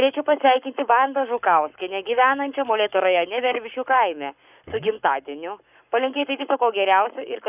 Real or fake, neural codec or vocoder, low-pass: fake; codec, 44.1 kHz, 7.8 kbps, Pupu-Codec; 3.6 kHz